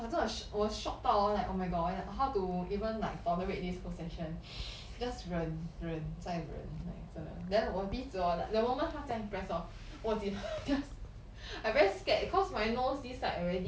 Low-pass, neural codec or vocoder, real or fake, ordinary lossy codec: none; none; real; none